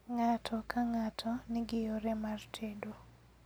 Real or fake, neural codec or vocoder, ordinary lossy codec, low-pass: real; none; none; none